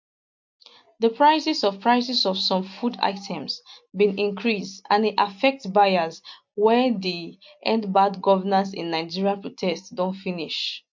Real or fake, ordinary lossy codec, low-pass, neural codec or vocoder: real; MP3, 48 kbps; 7.2 kHz; none